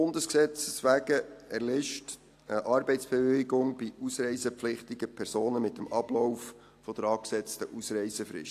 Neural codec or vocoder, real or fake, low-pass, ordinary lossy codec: none; real; 14.4 kHz; AAC, 64 kbps